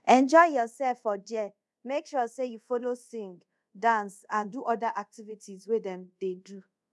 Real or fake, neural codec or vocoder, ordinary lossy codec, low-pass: fake; codec, 24 kHz, 0.5 kbps, DualCodec; none; none